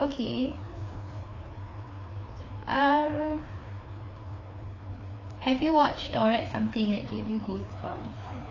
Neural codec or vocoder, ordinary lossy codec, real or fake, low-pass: codec, 16 kHz, 2 kbps, FreqCodec, larger model; AAC, 32 kbps; fake; 7.2 kHz